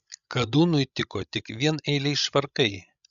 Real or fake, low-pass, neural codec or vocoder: fake; 7.2 kHz; codec, 16 kHz, 16 kbps, FreqCodec, larger model